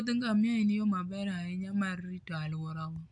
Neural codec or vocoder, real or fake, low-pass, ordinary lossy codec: none; real; 9.9 kHz; AAC, 64 kbps